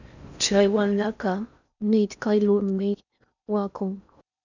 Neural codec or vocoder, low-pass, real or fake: codec, 16 kHz in and 24 kHz out, 0.6 kbps, FocalCodec, streaming, 4096 codes; 7.2 kHz; fake